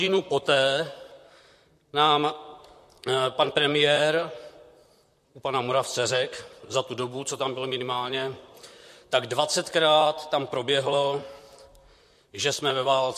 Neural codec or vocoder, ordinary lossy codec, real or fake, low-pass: vocoder, 44.1 kHz, 128 mel bands, Pupu-Vocoder; MP3, 64 kbps; fake; 14.4 kHz